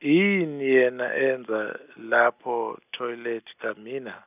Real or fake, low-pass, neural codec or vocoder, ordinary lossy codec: real; 3.6 kHz; none; none